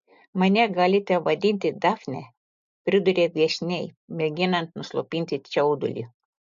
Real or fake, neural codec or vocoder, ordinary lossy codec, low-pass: real; none; MP3, 48 kbps; 7.2 kHz